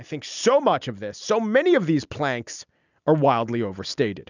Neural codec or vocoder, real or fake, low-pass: none; real; 7.2 kHz